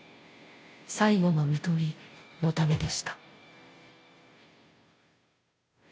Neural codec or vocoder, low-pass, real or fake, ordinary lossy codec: codec, 16 kHz, 0.5 kbps, FunCodec, trained on Chinese and English, 25 frames a second; none; fake; none